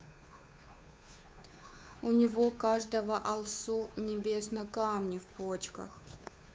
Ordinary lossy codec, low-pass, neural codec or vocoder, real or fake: none; none; codec, 16 kHz, 2 kbps, FunCodec, trained on Chinese and English, 25 frames a second; fake